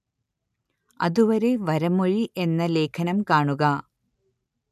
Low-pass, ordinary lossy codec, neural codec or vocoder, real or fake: 14.4 kHz; none; none; real